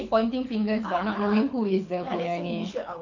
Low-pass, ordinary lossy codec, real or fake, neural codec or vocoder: 7.2 kHz; none; fake; codec, 24 kHz, 6 kbps, HILCodec